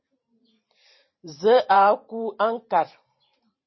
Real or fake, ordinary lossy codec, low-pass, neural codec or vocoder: fake; MP3, 24 kbps; 7.2 kHz; vocoder, 44.1 kHz, 128 mel bands every 512 samples, BigVGAN v2